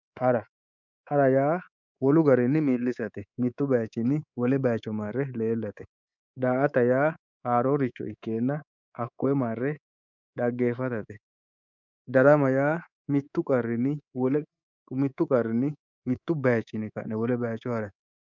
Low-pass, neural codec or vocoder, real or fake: 7.2 kHz; codec, 24 kHz, 3.1 kbps, DualCodec; fake